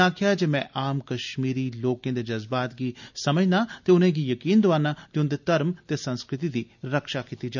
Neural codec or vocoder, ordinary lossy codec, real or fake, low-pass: none; none; real; 7.2 kHz